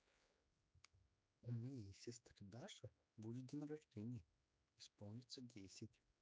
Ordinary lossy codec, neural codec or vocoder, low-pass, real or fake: none; codec, 16 kHz, 4 kbps, X-Codec, HuBERT features, trained on general audio; none; fake